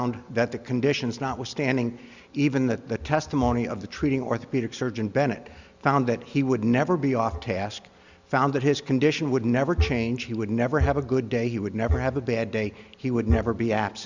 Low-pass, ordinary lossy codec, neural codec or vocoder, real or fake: 7.2 kHz; Opus, 64 kbps; none; real